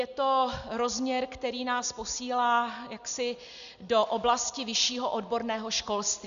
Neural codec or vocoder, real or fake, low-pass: none; real; 7.2 kHz